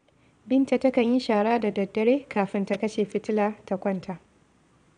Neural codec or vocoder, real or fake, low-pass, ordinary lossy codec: vocoder, 22.05 kHz, 80 mel bands, Vocos; fake; 9.9 kHz; none